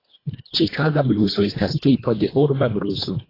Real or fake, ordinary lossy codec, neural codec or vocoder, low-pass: fake; AAC, 24 kbps; codec, 24 kHz, 1.5 kbps, HILCodec; 5.4 kHz